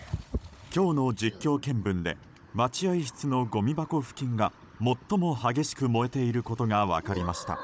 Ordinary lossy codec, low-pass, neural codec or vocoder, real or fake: none; none; codec, 16 kHz, 16 kbps, FunCodec, trained on Chinese and English, 50 frames a second; fake